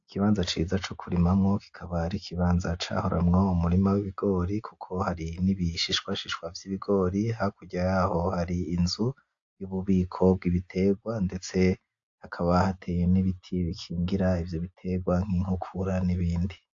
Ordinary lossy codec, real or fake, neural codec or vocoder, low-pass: AAC, 48 kbps; real; none; 7.2 kHz